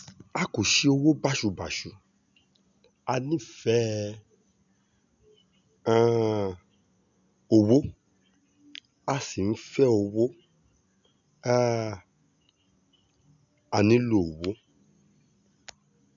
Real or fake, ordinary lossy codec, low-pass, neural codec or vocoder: real; none; 7.2 kHz; none